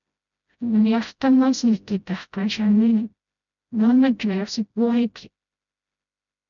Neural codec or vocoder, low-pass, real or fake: codec, 16 kHz, 0.5 kbps, FreqCodec, smaller model; 7.2 kHz; fake